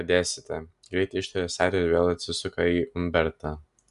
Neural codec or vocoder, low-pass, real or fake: none; 10.8 kHz; real